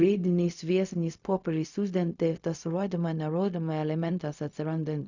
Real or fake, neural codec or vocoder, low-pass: fake; codec, 16 kHz, 0.4 kbps, LongCat-Audio-Codec; 7.2 kHz